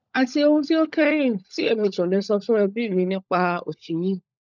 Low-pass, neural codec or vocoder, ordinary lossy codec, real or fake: 7.2 kHz; codec, 16 kHz, 16 kbps, FunCodec, trained on LibriTTS, 50 frames a second; none; fake